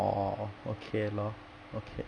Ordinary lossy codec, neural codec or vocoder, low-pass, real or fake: none; none; 9.9 kHz; real